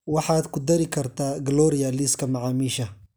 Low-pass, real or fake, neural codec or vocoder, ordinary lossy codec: none; real; none; none